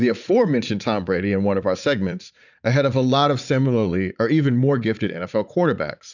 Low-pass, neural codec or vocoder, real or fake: 7.2 kHz; vocoder, 44.1 kHz, 80 mel bands, Vocos; fake